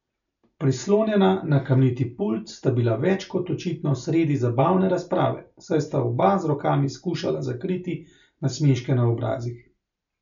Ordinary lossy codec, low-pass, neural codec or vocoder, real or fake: none; 7.2 kHz; none; real